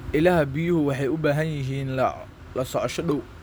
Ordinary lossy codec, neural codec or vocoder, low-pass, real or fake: none; none; none; real